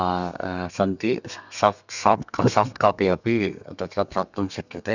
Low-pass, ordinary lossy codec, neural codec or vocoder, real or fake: 7.2 kHz; none; codec, 32 kHz, 1.9 kbps, SNAC; fake